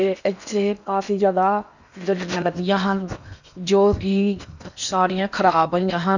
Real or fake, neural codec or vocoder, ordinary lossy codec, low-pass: fake; codec, 16 kHz in and 24 kHz out, 0.8 kbps, FocalCodec, streaming, 65536 codes; none; 7.2 kHz